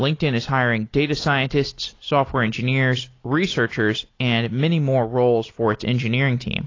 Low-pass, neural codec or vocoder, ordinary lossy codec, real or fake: 7.2 kHz; none; AAC, 32 kbps; real